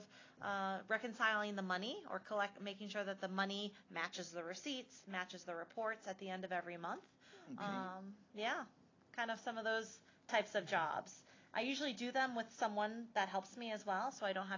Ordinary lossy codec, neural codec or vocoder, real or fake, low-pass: AAC, 32 kbps; none; real; 7.2 kHz